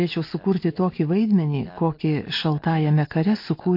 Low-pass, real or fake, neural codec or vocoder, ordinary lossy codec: 5.4 kHz; real; none; MP3, 32 kbps